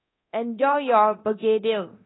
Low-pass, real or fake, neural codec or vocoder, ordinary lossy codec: 7.2 kHz; fake; codec, 24 kHz, 0.9 kbps, DualCodec; AAC, 16 kbps